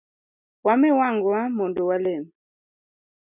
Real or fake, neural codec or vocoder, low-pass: real; none; 3.6 kHz